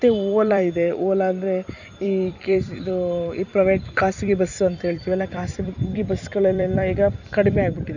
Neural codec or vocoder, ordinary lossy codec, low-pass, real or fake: vocoder, 44.1 kHz, 128 mel bands every 256 samples, BigVGAN v2; none; 7.2 kHz; fake